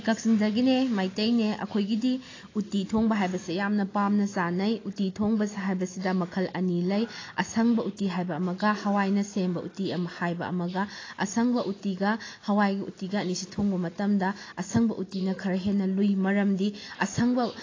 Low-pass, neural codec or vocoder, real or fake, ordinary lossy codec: 7.2 kHz; none; real; AAC, 32 kbps